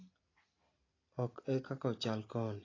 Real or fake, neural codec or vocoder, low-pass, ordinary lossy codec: real; none; 7.2 kHz; AAC, 32 kbps